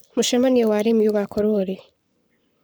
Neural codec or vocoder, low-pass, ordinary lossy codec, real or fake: codec, 44.1 kHz, 7.8 kbps, Pupu-Codec; none; none; fake